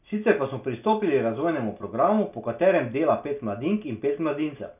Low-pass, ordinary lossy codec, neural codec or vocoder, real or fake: 3.6 kHz; none; none; real